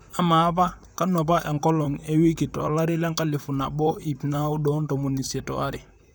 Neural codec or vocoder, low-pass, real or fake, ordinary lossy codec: vocoder, 44.1 kHz, 128 mel bands, Pupu-Vocoder; none; fake; none